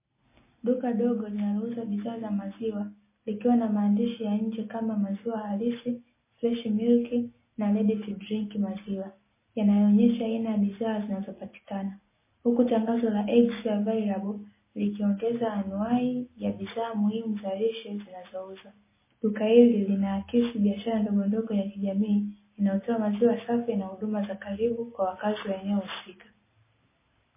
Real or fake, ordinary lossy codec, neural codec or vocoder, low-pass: real; MP3, 16 kbps; none; 3.6 kHz